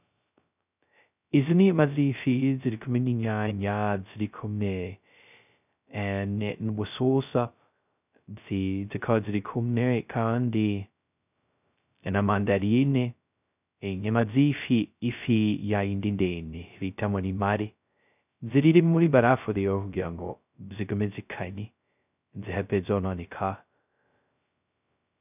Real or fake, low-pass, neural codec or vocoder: fake; 3.6 kHz; codec, 16 kHz, 0.2 kbps, FocalCodec